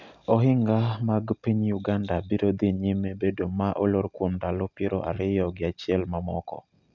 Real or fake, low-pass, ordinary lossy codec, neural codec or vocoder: real; 7.2 kHz; none; none